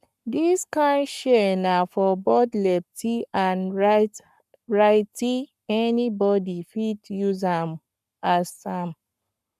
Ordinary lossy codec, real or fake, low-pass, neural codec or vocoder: none; fake; 14.4 kHz; codec, 44.1 kHz, 7.8 kbps, Pupu-Codec